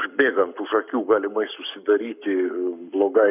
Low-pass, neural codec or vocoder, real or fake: 3.6 kHz; codec, 44.1 kHz, 7.8 kbps, Pupu-Codec; fake